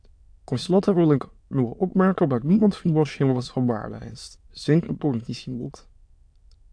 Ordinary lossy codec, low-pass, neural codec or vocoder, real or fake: AAC, 64 kbps; 9.9 kHz; autoencoder, 22.05 kHz, a latent of 192 numbers a frame, VITS, trained on many speakers; fake